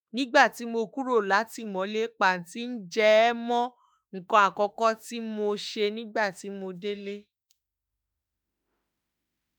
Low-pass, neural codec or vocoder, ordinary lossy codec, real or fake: none; autoencoder, 48 kHz, 32 numbers a frame, DAC-VAE, trained on Japanese speech; none; fake